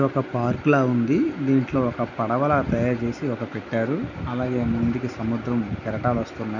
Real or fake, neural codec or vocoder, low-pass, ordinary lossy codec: real; none; 7.2 kHz; none